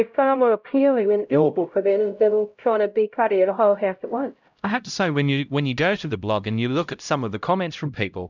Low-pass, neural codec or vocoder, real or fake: 7.2 kHz; codec, 16 kHz, 0.5 kbps, X-Codec, HuBERT features, trained on LibriSpeech; fake